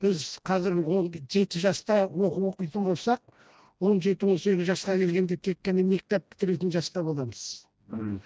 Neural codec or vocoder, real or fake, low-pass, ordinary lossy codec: codec, 16 kHz, 1 kbps, FreqCodec, smaller model; fake; none; none